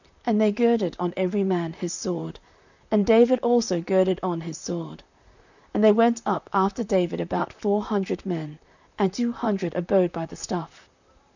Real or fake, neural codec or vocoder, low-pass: fake; vocoder, 44.1 kHz, 128 mel bands, Pupu-Vocoder; 7.2 kHz